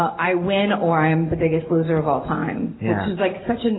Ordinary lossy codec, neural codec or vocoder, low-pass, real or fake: AAC, 16 kbps; none; 7.2 kHz; real